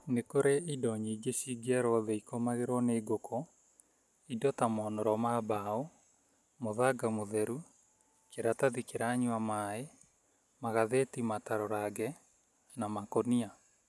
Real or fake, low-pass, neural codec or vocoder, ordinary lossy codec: real; none; none; none